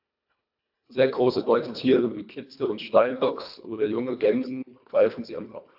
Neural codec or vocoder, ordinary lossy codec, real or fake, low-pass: codec, 24 kHz, 1.5 kbps, HILCodec; AAC, 48 kbps; fake; 5.4 kHz